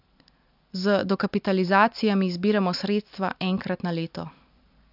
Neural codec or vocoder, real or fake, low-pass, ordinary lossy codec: none; real; 5.4 kHz; AAC, 48 kbps